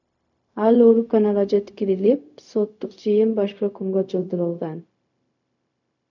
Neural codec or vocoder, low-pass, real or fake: codec, 16 kHz, 0.4 kbps, LongCat-Audio-Codec; 7.2 kHz; fake